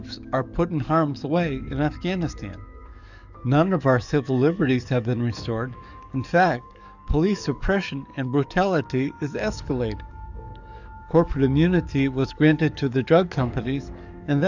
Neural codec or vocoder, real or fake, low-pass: codec, 44.1 kHz, 7.8 kbps, DAC; fake; 7.2 kHz